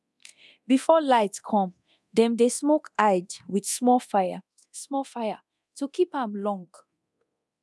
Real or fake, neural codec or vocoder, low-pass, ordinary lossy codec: fake; codec, 24 kHz, 0.9 kbps, DualCodec; none; none